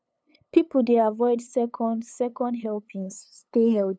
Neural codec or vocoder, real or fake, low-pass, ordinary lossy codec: codec, 16 kHz, 8 kbps, FunCodec, trained on LibriTTS, 25 frames a second; fake; none; none